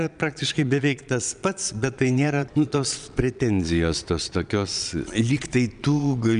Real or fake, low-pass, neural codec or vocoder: fake; 9.9 kHz; vocoder, 22.05 kHz, 80 mel bands, Vocos